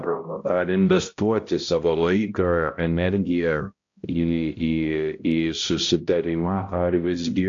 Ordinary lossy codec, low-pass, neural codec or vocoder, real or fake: AAC, 48 kbps; 7.2 kHz; codec, 16 kHz, 0.5 kbps, X-Codec, HuBERT features, trained on balanced general audio; fake